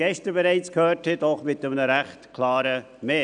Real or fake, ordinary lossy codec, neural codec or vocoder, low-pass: real; none; none; 9.9 kHz